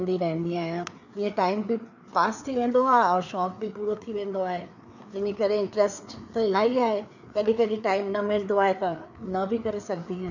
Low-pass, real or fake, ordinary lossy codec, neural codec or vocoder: 7.2 kHz; fake; none; codec, 16 kHz, 4 kbps, FreqCodec, larger model